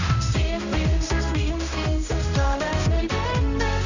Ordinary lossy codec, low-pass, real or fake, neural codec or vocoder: none; 7.2 kHz; fake; codec, 16 kHz, 1 kbps, X-Codec, HuBERT features, trained on general audio